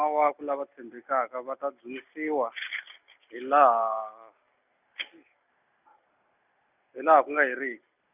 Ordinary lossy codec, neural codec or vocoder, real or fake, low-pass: none; none; real; 3.6 kHz